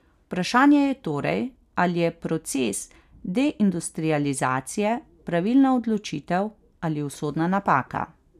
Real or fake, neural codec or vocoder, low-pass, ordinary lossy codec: real; none; 14.4 kHz; none